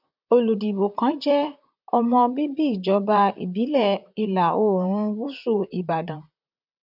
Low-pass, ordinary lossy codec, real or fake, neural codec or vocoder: 5.4 kHz; none; fake; vocoder, 44.1 kHz, 128 mel bands, Pupu-Vocoder